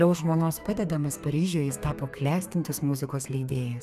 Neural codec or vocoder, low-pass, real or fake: codec, 32 kHz, 1.9 kbps, SNAC; 14.4 kHz; fake